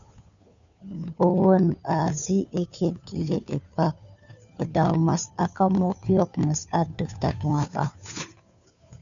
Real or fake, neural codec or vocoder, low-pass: fake; codec, 16 kHz, 2 kbps, FunCodec, trained on Chinese and English, 25 frames a second; 7.2 kHz